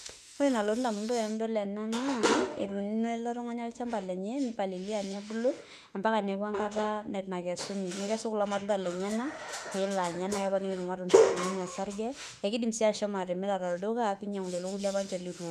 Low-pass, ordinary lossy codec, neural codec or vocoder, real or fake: 14.4 kHz; none; autoencoder, 48 kHz, 32 numbers a frame, DAC-VAE, trained on Japanese speech; fake